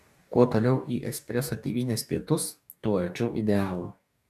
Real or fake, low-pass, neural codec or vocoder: fake; 14.4 kHz; codec, 44.1 kHz, 2.6 kbps, DAC